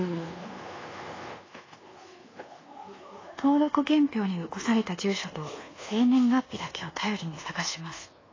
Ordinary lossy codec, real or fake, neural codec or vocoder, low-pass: AAC, 32 kbps; fake; codec, 24 kHz, 1.2 kbps, DualCodec; 7.2 kHz